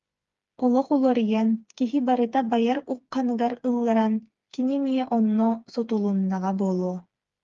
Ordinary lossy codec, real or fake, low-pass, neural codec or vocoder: Opus, 32 kbps; fake; 7.2 kHz; codec, 16 kHz, 4 kbps, FreqCodec, smaller model